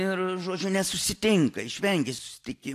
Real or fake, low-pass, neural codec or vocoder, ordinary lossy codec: real; 14.4 kHz; none; AAC, 64 kbps